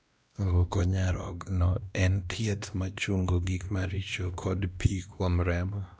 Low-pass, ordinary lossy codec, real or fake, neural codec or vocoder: none; none; fake; codec, 16 kHz, 1 kbps, X-Codec, WavLM features, trained on Multilingual LibriSpeech